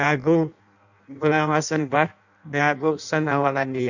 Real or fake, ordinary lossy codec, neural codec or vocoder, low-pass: fake; none; codec, 16 kHz in and 24 kHz out, 0.6 kbps, FireRedTTS-2 codec; 7.2 kHz